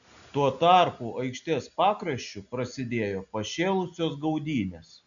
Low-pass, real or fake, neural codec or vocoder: 7.2 kHz; real; none